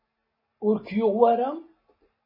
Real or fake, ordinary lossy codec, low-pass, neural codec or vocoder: real; MP3, 24 kbps; 5.4 kHz; none